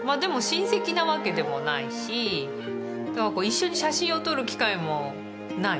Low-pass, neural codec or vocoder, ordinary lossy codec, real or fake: none; none; none; real